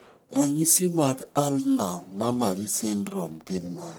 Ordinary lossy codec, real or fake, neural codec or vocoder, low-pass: none; fake; codec, 44.1 kHz, 1.7 kbps, Pupu-Codec; none